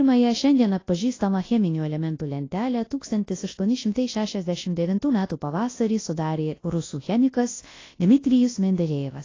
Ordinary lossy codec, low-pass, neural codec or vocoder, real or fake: AAC, 32 kbps; 7.2 kHz; codec, 24 kHz, 0.9 kbps, WavTokenizer, large speech release; fake